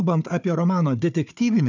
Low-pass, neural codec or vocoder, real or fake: 7.2 kHz; codec, 16 kHz, 4 kbps, FunCodec, trained on Chinese and English, 50 frames a second; fake